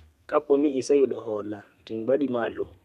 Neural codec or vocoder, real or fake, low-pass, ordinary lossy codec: codec, 32 kHz, 1.9 kbps, SNAC; fake; 14.4 kHz; none